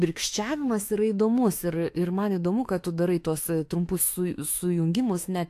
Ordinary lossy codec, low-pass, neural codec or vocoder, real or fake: AAC, 64 kbps; 14.4 kHz; autoencoder, 48 kHz, 32 numbers a frame, DAC-VAE, trained on Japanese speech; fake